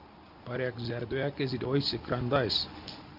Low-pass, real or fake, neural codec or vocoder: 5.4 kHz; real; none